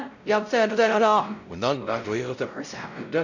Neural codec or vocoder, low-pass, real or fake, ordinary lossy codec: codec, 16 kHz, 0.5 kbps, X-Codec, WavLM features, trained on Multilingual LibriSpeech; 7.2 kHz; fake; none